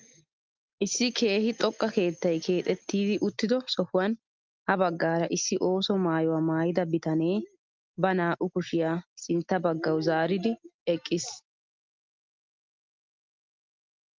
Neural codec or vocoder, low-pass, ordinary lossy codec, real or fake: none; 7.2 kHz; Opus, 32 kbps; real